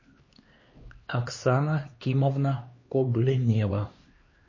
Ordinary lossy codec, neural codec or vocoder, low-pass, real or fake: MP3, 32 kbps; codec, 16 kHz, 2 kbps, X-Codec, HuBERT features, trained on LibriSpeech; 7.2 kHz; fake